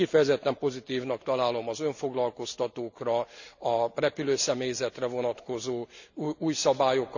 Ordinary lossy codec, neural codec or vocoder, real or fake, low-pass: none; none; real; 7.2 kHz